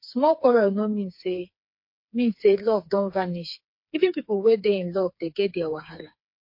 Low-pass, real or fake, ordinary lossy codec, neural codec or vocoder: 5.4 kHz; fake; MP3, 32 kbps; codec, 16 kHz, 4 kbps, FreqCodec, smaller model